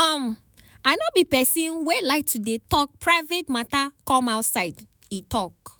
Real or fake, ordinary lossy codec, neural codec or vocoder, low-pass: fake; none; autoencoder, 48 kHz, 128 numbers a frame, DAC-VAE, trained on Japanese speech; none